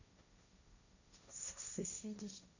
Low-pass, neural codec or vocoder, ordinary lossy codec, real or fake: 7.2 kHz; codec, 16 kHz, 1.1 kbps, Voila-Tokenizer; none; fake